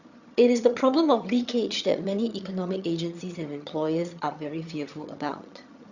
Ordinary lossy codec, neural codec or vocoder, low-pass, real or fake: Opus, 64 kbps; vocoder, 22.05 kHz, 80 mel bands, HiFi-GAN; 7.2 kHz; fake